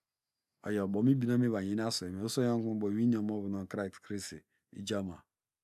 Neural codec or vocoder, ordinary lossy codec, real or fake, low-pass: none; none; real; 14.4 kHz